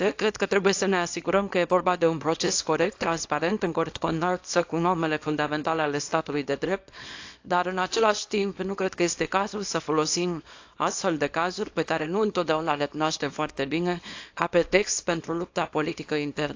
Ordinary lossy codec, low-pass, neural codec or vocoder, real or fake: AAC, 48 kbps; 7.2 kHz; codec, 24 kHz, 0.9 kbps, WavTokenizer, small release; fake